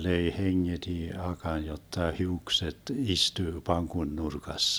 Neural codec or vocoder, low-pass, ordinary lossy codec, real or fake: none; none; none; real